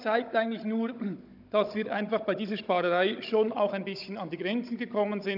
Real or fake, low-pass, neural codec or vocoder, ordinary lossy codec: fake; 5.4 kHz; codec, 16 kHz, 16 kbps, FunCodec, trained on Chinese and English, 50 frames a second; none